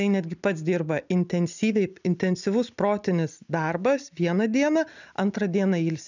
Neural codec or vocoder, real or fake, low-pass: none; real; 7.2 kHz